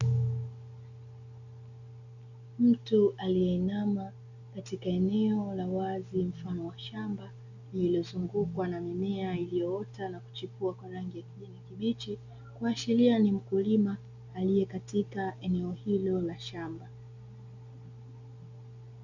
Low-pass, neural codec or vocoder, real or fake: 7.2 kHz; none; real